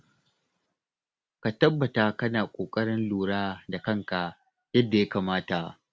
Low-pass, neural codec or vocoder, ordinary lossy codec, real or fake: none; none; none; real